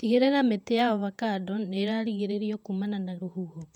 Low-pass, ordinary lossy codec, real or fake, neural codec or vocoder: 19.8 kHz; none; fake; vocoder, 48 kHz, 128 mel bands, Vocos